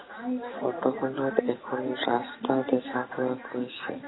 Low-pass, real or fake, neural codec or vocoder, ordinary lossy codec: 7.2 kHz; real; none; AAC, 16 kbps